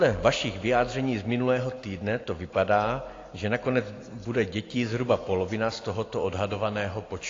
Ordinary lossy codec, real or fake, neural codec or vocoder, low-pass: AAC, 32 kbps; real; none; 7.2 kHz